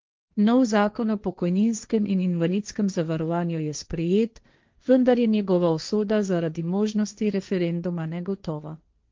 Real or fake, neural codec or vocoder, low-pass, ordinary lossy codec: fake; codec, 16 kHz, 1.1 kbps, Voila-Tokenizer; 7.2 kHz; Opus, 24 kbps